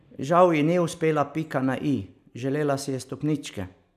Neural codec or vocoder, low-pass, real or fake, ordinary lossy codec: none; 14.4 kHz; real; none